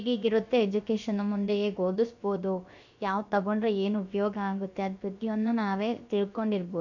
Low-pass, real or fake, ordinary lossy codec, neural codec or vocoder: 7.2 kHz; fake; none; codec, 16 kHz, about 1 kbps, DyCAST, with the encoder's durations